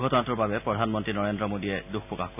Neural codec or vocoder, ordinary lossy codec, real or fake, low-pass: none; none; real; 3.6 kHz